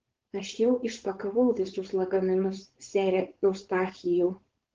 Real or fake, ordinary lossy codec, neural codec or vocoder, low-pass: fake; Opus, 16 kbps; codec, 16 kHz, 4.8 kbps, FACodec; 7.2 kHz